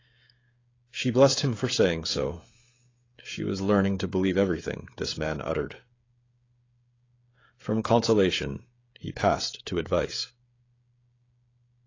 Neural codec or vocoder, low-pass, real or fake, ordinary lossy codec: codec, 16 kHz, 16 kbps, FreqCodec, smaller model; 7.2 kHz; fake; AAC, 32 kbps